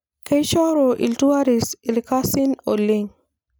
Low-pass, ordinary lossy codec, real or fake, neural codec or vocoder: none; none; real; none